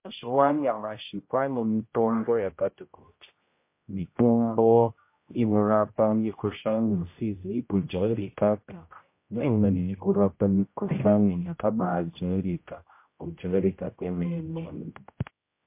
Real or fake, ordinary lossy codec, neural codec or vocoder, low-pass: fake; MP3, 24 kbps; codec, 16 kHz, 0.5 kbps, X-Codec, HuBERT features, trained on general audio; 3.6 kHz